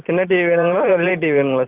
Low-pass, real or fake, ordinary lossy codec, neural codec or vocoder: 3.6 kHz; fake; Opus, 24 kbps; vocoder, 44.1 kHz, 128 mel bands every 512 samples, BigVGAN v2